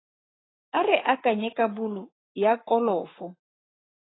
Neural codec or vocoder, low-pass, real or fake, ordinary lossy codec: codec, 44.1 kHz, 7.8 kbps, Pupu-Codec; 7.2 kHz; fake; AAC, 16 kbps